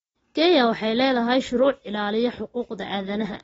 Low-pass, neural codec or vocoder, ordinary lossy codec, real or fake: 19.8 kHz; none; AAC, 24 kbps; real